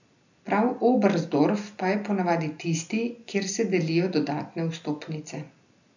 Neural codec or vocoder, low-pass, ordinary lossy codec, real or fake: none; 7.2 kHz; none; real